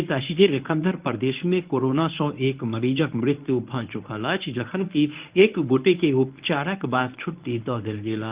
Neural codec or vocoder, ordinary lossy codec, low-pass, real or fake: codec, 24 kHz, 0.9 kbps, WavTokenizer, medium speech release version 1; Opus, 32 kbps; 3.6 kHz; fake